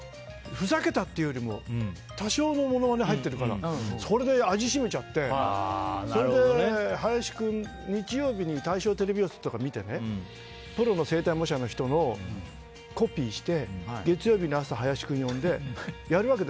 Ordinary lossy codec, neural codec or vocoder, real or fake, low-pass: none; none; real; none